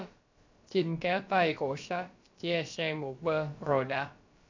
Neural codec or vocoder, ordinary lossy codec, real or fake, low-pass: codec, 16 kHz, about 1 kbps, DyCAST, with the encoder's durations; MP3, 64 kbps; fake; 7.2 kHz